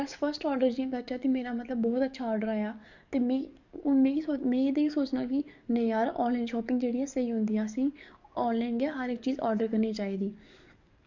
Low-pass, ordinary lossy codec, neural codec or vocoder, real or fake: 7.2 kHz; none; codec, 44.1 kHz, 7.8 kbps, DAC; fake